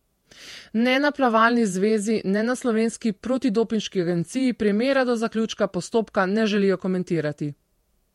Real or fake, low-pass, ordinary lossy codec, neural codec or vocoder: fake; 19.8 kHz; MP3, 64 kbps; vocoder, 48 kHz, 128 mel bands, Vocos